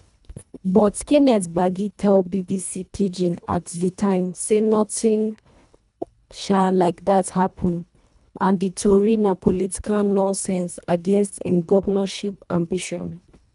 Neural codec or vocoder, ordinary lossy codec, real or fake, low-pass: codec, 24 kHz, 1.5 kbps, HILCodec; none; fake; 10.8 kHz